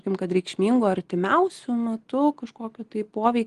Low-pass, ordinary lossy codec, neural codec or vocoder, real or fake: 10.8 kHz; Opus, 24 kbps; none; real